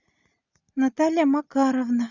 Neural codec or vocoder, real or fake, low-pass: none; real; 7.2 kHz